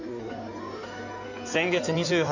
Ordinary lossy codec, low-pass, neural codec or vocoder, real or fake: none; 7.2 kHz; codec, 16 kHz in and 24 kHz out, 2.2 kbps, FireRedTTS-2 codec; fake